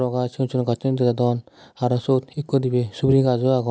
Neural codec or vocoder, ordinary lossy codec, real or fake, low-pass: none; none; real; none